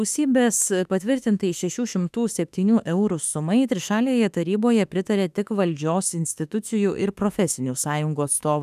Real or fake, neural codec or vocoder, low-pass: fake; autoencoder, 48 kHz, 32 numbers a frame, DAC-VAE, trained on Japanese speech; 14.4 kHz